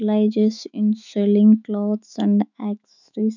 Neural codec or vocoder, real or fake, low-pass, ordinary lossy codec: autoencoder, 48 kHz, 128 numbers a frame, DAC-VAE, trained on Japanese speech; fake; 7.2 kHz; none